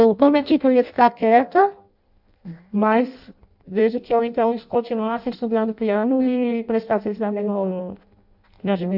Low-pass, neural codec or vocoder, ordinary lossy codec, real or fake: 5.4 kHz; codec, 16 kHz in and 24 kHz out, 0.6 kbps, FireRedTTS-2 codec; AAC, 48 kbps; fake